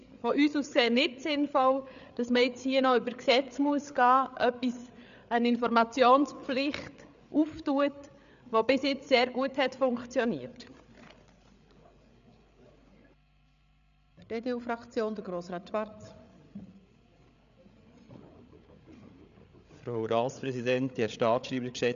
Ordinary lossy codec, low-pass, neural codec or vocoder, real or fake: none; 7.2 kHz; codec, 16 kHz, 8 kbps, FreqCodec, larger model; fake